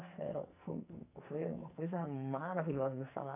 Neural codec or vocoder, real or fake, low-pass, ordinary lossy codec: codec, 44.1 kHz, 2.6 kbps, SNAC; fake; 3.6 kHz; none